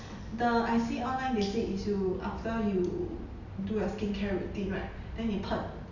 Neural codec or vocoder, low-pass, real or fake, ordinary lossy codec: none; 7.2 kHz; real; none